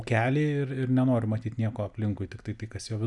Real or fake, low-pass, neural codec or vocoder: real; 10.8 kHz; none